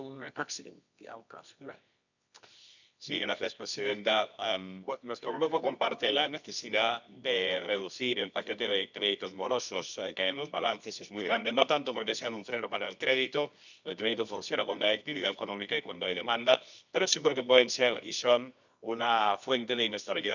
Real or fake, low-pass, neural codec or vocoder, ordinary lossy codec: fake; 7.2 kHz; codec, 24 kHz, 0.9 kbps, WavTokenizer, medium music audio release; none